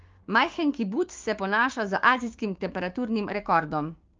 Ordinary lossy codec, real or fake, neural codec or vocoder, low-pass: Opus, 24 kbps; fake; codec, 16 kHz, 6 kbps, DAC; 7.2 kHz